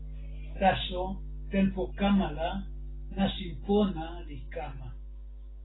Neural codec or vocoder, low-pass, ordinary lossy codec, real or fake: none; 7.2 kHz; AAC, 16 kbps; real